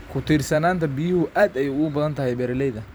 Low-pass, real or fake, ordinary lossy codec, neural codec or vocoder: none; fake; none; vocoder, 44.1 kHz, 128 mel bands every 256 samples, BigVGAN v2